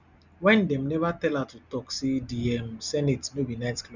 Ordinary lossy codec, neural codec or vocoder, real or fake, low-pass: none; none; real; 7.2 kHz